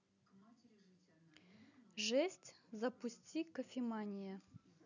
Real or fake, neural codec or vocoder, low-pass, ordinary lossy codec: real; none; 7.2 kHz; none